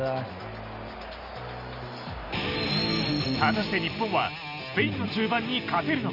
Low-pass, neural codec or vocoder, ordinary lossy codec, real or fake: 5.4 kHz; none; none; real